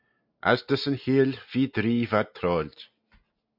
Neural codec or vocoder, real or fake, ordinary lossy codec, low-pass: none; real; MP3, 48 kbps; 5.4 kHz